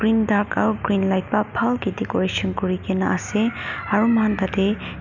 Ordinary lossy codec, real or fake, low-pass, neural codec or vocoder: none; real; 7.2 kHz; none